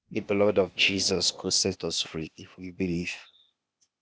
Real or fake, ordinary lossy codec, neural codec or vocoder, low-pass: fake; none; codec, 16 kHz, 0.8 kbps, ZipCodec; none